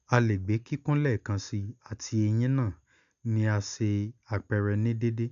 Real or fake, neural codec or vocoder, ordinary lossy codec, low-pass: real; none; none; 7.2 kHz